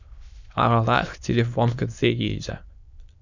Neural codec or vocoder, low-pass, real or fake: autoencoder, 22.05 kHz, a latent of 192 numbers a frame, VITS, trained on many speakers; 7.2 kHz; fake